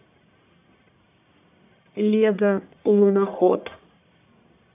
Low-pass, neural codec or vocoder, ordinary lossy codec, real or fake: 3.6 kHz; codec, 44.1 kHz, 1.7 kbps, Pupu-Codec; AAC, 32 kbps; fake